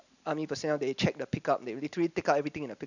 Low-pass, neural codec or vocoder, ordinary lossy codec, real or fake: 7.2 kHz; none; none; real